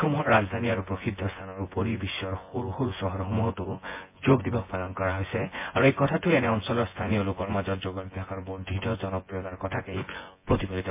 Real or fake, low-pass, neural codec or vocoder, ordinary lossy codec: fake; 3.6 kHz; vocoder, 24 kHz, 100 mel bands, Vocos; MP3, 24 kbps